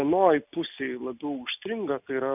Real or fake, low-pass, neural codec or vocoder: real; 3.6 kHz; none